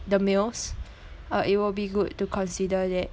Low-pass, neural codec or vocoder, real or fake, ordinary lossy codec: none; none; real; none